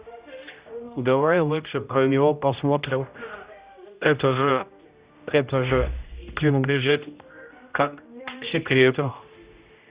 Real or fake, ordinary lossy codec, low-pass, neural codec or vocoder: fake; Opus, 64 kbps; 3.6 kHz; codec, 16 kHz, 0.5 kbps, X-Codec, HuBERT features, trained on general audio